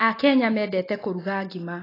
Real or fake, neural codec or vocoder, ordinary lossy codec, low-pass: real; none; AAC, 24 kbps; 5.4 kHz